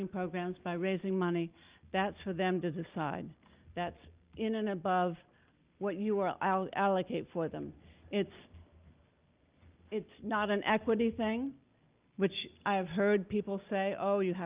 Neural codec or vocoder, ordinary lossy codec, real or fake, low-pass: none; Opus, 24 kbps; real; 3.6 kHz